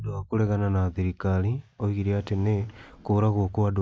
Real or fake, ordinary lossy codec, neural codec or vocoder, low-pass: real; none; none; none